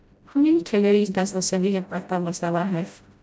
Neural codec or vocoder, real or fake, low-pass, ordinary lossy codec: codec, 16 kHz, 0.5 kbps, FreqCodec, smaller model; fake; none; none